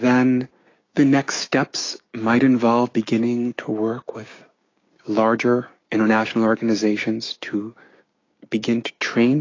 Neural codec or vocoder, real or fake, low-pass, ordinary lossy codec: codec, 16 kHz, 6 kbps, DAC; fake; 7.2 kHz; AAC, 32 kbps